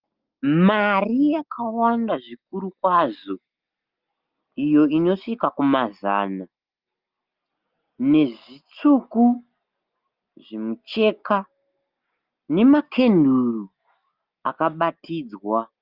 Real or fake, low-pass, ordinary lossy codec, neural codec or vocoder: real; 5.4 kHz; Opus, 24 kbps; none